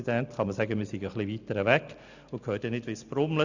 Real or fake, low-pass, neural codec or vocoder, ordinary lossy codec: real; 7.2 kHz; none; none